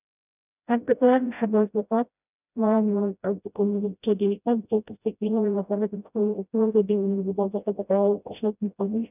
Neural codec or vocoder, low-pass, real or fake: codec, 16 kHz, 0.5 kbps, FreqCodec, smaller model; 3.6 kHz; fake